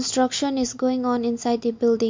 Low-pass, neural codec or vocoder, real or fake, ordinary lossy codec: 7.2 kHz; none; real; MP3, 48 kbps